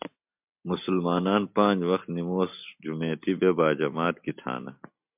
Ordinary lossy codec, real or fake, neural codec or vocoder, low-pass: MP3, 32 kbps; real; none; 3.6 kHz